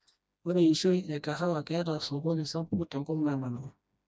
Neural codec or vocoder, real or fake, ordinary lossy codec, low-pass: codec, 16 kHz, 1 kbps, FreqCodec, smaller model; fake; none; none